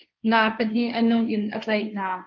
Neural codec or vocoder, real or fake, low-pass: codec, 16 kHz, 1.1 kbps, Voila-Tokenizer; fake; 7.2 kHz